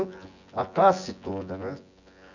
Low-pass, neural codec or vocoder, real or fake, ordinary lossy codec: 7.2 kHz; vocoder, 24 kHz, 100 mel bands, Vocos; fake; none